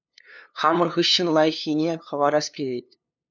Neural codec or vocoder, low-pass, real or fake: codec, 16 kHz, 2 kbps, FunCodec, trained on LibriTTS, 25 frames a second; 7.2 kHz; fake